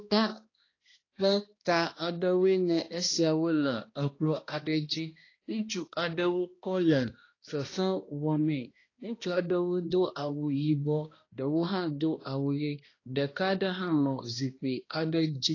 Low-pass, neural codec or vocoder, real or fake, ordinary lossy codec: 7.2 kHz; codec, 16 kHz, 1 kbps, X-Codec, HuBERT features, trained on balanced general audio; fake; AAC, 32 kbps